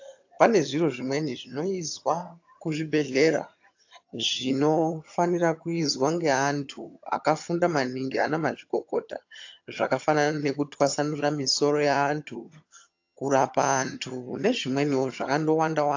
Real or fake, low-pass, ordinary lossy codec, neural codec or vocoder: fake; 7.2 kHz; AAC, 48 kbps; vocoder, 22.05 kHz, 80 mel bands, HiFi-GAN